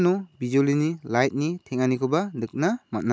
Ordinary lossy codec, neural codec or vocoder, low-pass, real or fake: none; none; none; real